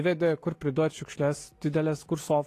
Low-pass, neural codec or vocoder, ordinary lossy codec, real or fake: 14.4 kHz; none; AAC, 48 kbps; real